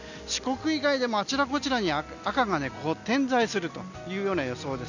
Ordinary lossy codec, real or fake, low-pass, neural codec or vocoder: none; real; 7.2 kHz; none